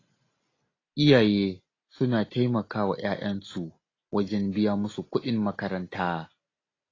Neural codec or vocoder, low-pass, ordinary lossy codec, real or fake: none; 7.2 kHz; AAC, 32 kbps; real